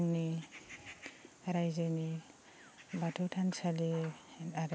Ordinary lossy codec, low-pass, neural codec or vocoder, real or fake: none; none; none; real